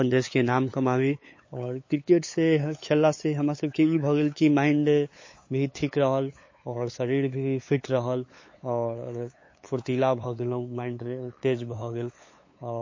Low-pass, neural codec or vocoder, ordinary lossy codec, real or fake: 7.2 kHz; codec, 16 kHz, 8 kbps, FunCodec, trained on LibriTTS, 25 frames a second; MP3, 32 kbps; fake